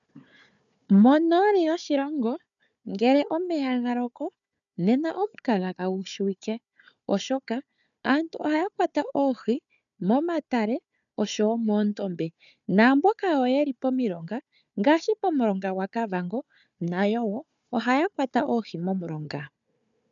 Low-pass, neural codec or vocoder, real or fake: 7.2 kHz; codec, 16 kHz, 4 kbps, FunCodec, trained on Chinese and English, 50 frames a second; fake